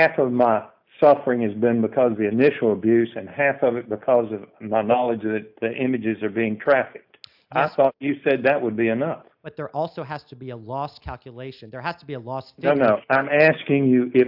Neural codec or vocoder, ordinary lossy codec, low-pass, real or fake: none; AAC, 48 kbps; 5.4 kHz; real